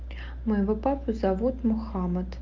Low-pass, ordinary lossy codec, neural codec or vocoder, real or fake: 7.2 kHz; Opus, 32 kbps; none; real